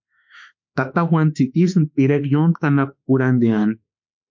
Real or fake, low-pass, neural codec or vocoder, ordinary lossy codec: fake; 7.2 kHz; autoencoder, 48 kHz, 32 numbers a frame, DAC-VAE, trained on Japanese speech; MP3, 48 kbps